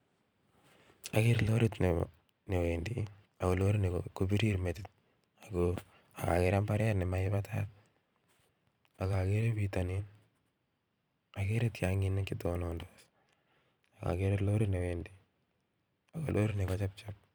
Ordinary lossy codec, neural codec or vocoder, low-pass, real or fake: none; vocoder, 44.1 kHz, 128 mel bands every 512 samples, BigVGAN v2; none; fake